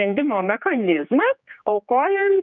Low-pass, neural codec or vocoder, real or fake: 7.2 kHz; codec, 16 kHz, 4 kbps, X-Codec, HuBERT features, trained on general audio; fake